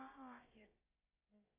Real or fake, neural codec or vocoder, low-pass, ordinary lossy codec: fake; codec, 16 kHz, about 1 kbps, DyCAST, with the encoder's durations; 3.6 kHz; AAC, 16 kbps